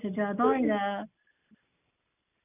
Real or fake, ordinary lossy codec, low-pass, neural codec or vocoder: real; none; 3.6 kHz; none